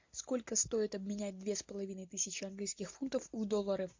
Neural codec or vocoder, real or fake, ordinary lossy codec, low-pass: none; real; MP3, 48 kbps; 7.2 kHz